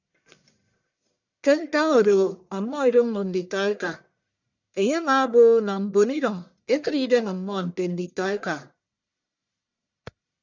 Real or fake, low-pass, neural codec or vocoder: fake; 7.2 kHz; codec, 44.1 kHz, 1.7 kbps, Pupu-Codec